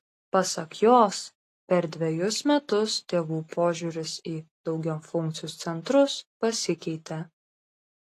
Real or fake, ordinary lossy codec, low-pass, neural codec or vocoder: real; AAC, 48 kbps; 14.4 kHz; none